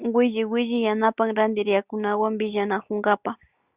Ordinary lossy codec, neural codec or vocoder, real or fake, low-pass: Opus, 64 kbps; none; real; 3.6 kHz